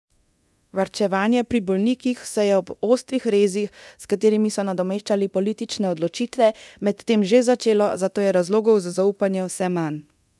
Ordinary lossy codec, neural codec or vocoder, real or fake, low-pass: none; codec, 24 kHz, 0.9 kbps, DualCodec; fake; none